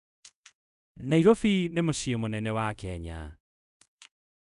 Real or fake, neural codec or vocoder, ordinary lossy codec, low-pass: fake; codec, 24 kHz, 0.5 kbps, DualCodec; none; 10.8 kHz